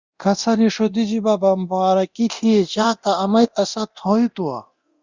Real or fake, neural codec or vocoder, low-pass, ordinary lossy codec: fake; codec, 24 kHz, 0.9 kbps, DualCodec; 7.2 kHz; Opus, 64 kbps